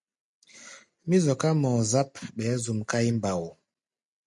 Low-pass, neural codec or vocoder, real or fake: 10.8 kHz; none; real